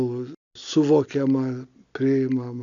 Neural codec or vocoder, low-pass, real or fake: none; 7.2 kHz; real